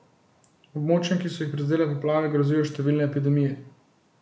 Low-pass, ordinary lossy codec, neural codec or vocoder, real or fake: none; none; none; real